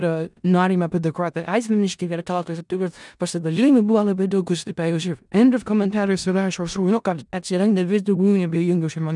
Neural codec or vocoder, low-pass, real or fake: codec, 16 kHz in and 24 kHz out, 0.4 kbps, LongCat-Audio-Codec, four codebook decoder; 10.8 kHz; fake